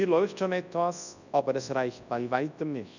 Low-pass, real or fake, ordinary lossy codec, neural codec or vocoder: 7.2 kHz; fake; none; codec, 24 kHz, 0.9 kbps, WavTokenizer, large speech release